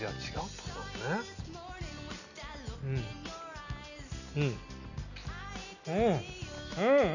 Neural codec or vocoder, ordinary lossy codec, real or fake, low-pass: none; none; real; 7.2 kHz